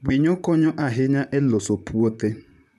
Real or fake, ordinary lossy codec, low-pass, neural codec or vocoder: fake; none; 14.4 kHz; vocoder, 44.1 kHz, 128 mel bands, Pupu-Vocoder